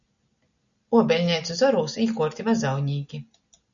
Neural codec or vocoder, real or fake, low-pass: none; real; 7.2 kHz